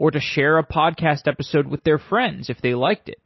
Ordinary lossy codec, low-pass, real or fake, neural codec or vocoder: MP3, 24 kbps; 7.2 kHz; real; none